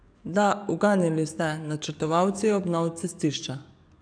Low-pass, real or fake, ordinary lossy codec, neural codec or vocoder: 9.9 kHz; fake; none; codec, 44.1 kHz, 7.8 kbps, DAC